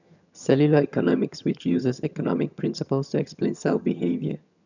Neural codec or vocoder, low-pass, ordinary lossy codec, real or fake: vocoder, 22.05 kHz, 80 mel bands, HiFi-GAN; 7.2 kHz; none; fake